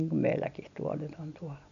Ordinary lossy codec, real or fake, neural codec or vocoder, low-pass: MP3, 96 kbps; real; none; 7.2 kHz